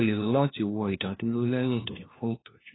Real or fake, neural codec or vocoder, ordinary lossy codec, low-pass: fake; codec, 16 kHz, 1 kbps, FunCodec, trained on LibriTTS, 50 frames a second; AAC, 16 kbps; 7.2 kHz